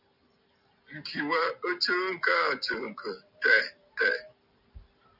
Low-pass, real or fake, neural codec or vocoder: 5.4 kHz; real; none